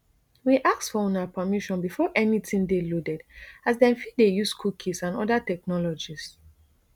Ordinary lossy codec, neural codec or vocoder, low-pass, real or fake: none; none; 19.8 kHz; real